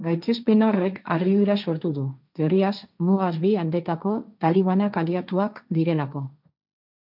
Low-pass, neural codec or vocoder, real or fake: 5.4 kHz; codec, 16 kHz, 1.1 kbps, Voila-Tokenizer; fake